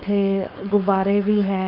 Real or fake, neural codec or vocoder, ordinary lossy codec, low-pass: fake; codec, 16 kHz, 4.8 kbps, FACodec; none; 5.4 kHz